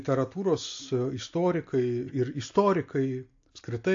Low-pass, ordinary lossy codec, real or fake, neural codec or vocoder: 7.2 kHz; AAC, 48 kbps; real; none